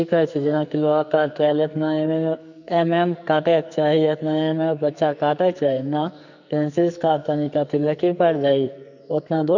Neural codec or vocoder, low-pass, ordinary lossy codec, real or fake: codec, 44.1 kHz, 2.6 kbps, SNAC; 7.2 kHz; none; fake